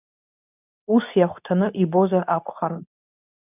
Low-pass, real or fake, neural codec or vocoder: 3.6 kHz; fake; codec, 24 kHz, 0.9 kbps, WavTokenizer, medium speech release version 2